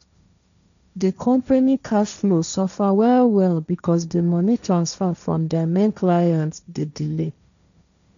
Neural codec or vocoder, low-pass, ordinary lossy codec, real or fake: codec, 16 kHz, 1.1 kbps, Voila-Tokenizer; 7.2 kHz; none; fake